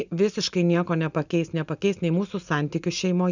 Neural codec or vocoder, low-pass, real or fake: none; 7.2 kHz; real